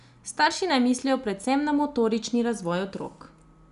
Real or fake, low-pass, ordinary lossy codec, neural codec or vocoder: real; 10.8 kHz; none; none